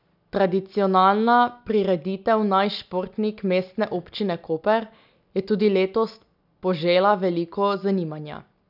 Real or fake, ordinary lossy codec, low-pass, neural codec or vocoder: real; none; 5.4 kHz; none